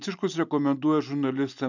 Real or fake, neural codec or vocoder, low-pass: real; none; 7.2 kHz